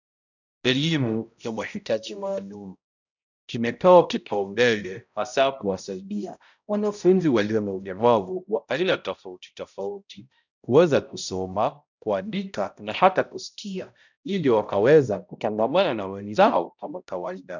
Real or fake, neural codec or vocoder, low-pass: fake; codec, 16 kHz, 0.5 kbps, X-Codec, HuBERT features, trained on balanced general audio; 7.2 kHz